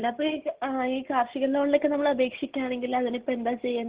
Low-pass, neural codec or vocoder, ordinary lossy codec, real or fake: 3.6 kHz; none; Opus, 16 kbps; real